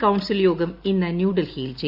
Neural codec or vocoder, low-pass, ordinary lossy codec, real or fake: none; 5.4 kHz; Opus, 64 kbps; real